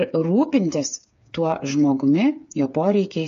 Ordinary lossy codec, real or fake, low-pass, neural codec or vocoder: AAC, 64 kbps; fake; 7.2 kHz; codec, 16 kHz, 8 kbps, FreqCodec, smaller model